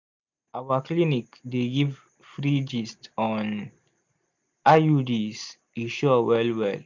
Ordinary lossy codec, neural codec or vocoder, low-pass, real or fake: MP3, 64 kbps; none; 7.2 kHz; real